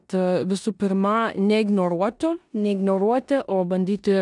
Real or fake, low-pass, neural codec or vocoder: fake; 10.8 kHz; codec, 16 kHz in and 24 kHz out, 0.9 kbps, LongCat-Audio-Codec, four codebook decoder